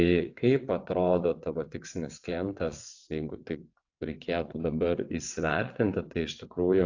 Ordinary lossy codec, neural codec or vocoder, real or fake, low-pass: AAC, 48 kbps; vocoder, 22.05 kHz, 80 mel bands, WaveNeXt; fake; 7.2 kHz